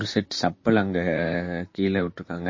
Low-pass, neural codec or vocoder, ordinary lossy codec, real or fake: 7.2 kHz; vocoder, 44.1 kHz, 128 mel bands, Pupu-Vocoder; MP3, 32 kbps; fake